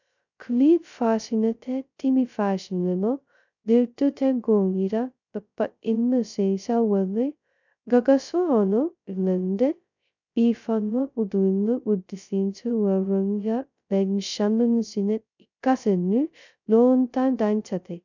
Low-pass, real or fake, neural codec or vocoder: 7.2 kHz; fake; codec, 16 kHz, 0.2 kbps, FocalCodec